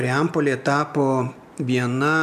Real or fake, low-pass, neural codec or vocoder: real; 14.4 kHz; none